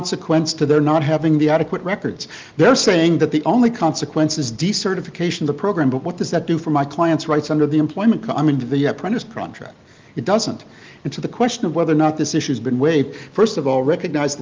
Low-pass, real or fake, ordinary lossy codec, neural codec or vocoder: 7.2 kHz; real; Opus, 24 kbps; none